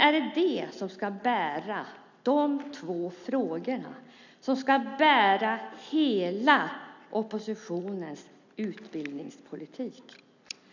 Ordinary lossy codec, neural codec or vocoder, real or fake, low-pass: none; none; real; 7.2 kHz